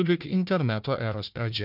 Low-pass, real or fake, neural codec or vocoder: 5.4 kHz; fake; codec, 16 kHz, 1 kbps, FunCodec, trained on Chinese and English, 50 frames a second